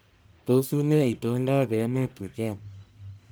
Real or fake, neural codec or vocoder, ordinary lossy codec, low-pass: fake; codec, 44.1 kHz, 1.7 kbps, Pupu-Codec; none; none